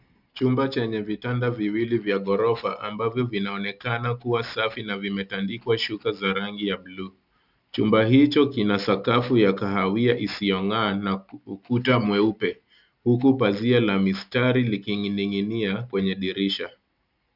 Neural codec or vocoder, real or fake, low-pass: none; real; 5.4 kHz